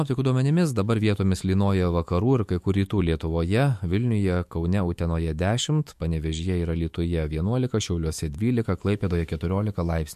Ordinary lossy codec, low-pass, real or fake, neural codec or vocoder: MP3, 64 kbps; 14.4 kHz; fake; autoencoder, 48 kHz, 128 numbers a frame, DAC-VAE, trained on Japanese speech